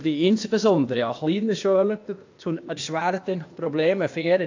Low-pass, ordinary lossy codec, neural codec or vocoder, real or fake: 7.2 kHz; AAC, 48 kbps; codec, 16 kHz, 0.8 kbps, ZipCodec; fake